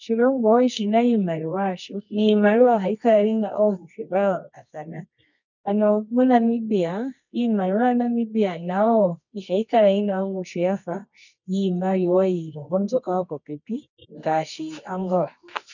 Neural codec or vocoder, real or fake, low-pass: codec, 24 kHz, 0.9 kbps, WavTokenizer, medium music audio release; fake; 7.2 kHz